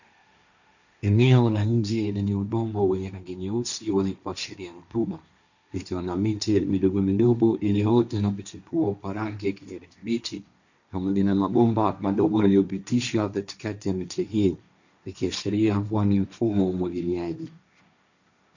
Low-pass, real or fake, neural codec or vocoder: 7.2 kHz; fake; codec, 16 kHz, 1.1 kbps, Voila-Tokenizer